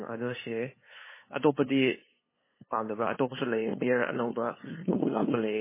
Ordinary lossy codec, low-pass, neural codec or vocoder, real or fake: MP3, 16 kbps; 3.6 kHz; codec, 16 kHz, 2 kbps, FunCodec, trained on LibriTTS, 25 frames a second; fake